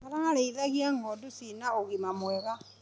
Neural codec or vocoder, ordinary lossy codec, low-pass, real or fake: none; none; none; real